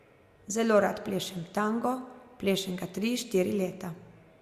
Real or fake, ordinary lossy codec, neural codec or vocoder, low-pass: real; Opus, 64 kbps; none; 14.4 kHz